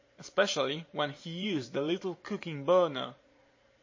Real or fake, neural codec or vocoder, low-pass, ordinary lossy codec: fake; vocoder, 44.1 kHz, 128 mel bands every 512 samples, BigVGAN v2; 7.2 kHz; MP3, 32 kbps